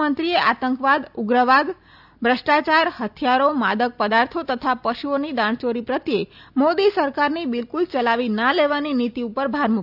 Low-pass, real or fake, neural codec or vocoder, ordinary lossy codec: 5.4 kHz; real; none; none